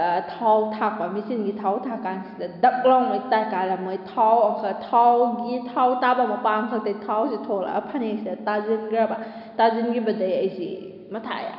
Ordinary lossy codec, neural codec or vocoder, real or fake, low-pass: none; none; real; 5.4 kHz